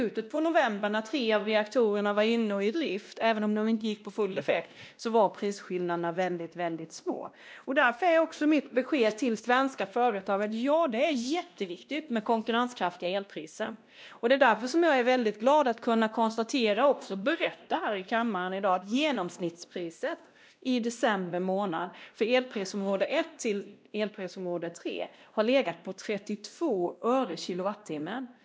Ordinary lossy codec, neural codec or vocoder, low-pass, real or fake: none; codec, 16 kHz, 1 kbps, X-Codec, WavLM features, trained on Multilingual LibriSpeech; none; fake